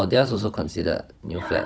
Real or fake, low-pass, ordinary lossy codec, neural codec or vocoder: fake; none; none; codec, 16 kHz, 16 kbps, FunCodec, trained on Chinese and English, 50 frames a second